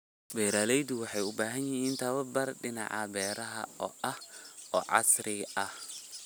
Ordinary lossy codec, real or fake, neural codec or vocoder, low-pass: none; real; none; none